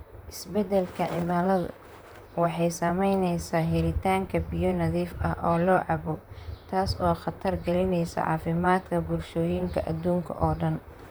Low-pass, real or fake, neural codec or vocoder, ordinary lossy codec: none; fake; vocoder, 44.1 kHz, 128 mel bands, Pupu-Vocoder; none